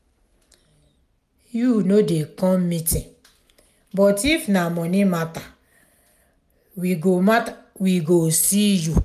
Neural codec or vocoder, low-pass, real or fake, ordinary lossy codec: none; 14.4 kHz; real; none